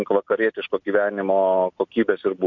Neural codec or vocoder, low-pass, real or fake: none; 7.2 kHz; real